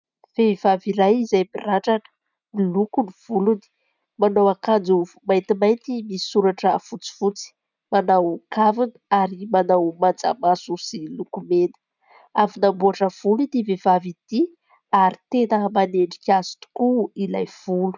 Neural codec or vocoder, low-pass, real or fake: none; 7.2 kHz; real